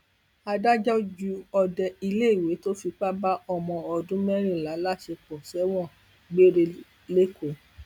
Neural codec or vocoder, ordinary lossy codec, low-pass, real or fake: none; none; 19.8 kHz; real